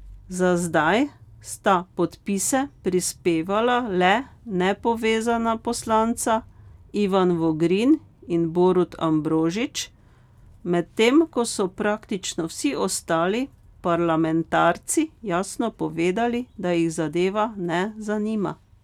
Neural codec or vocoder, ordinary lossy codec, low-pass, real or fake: none; none; 19.8 kHz; real